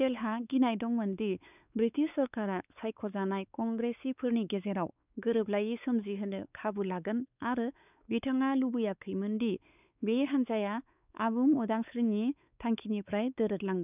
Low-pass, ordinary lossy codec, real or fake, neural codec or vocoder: 3.6 kHz; none; fake; codec, 16 kHz, 4 kbps, X-Codec, WavLM features, trained on Multilingual LibriSpeech